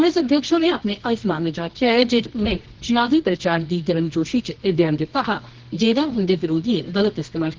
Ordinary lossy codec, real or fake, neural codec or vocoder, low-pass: Opus, 16 kbps; fake; codec, 24 kHz, 0.9 kbps, WavTokenizer, medium music audio release; 7.2 kHz